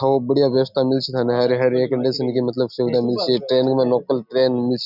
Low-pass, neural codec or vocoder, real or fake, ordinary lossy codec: 5.4 kHz; none; real; none